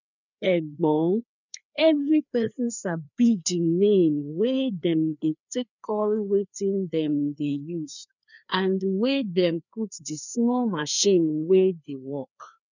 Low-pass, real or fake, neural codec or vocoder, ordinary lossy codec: 7.2 kHz; fake; codec, 16 kHz, 2 kbps, FreqCodec, larger model; none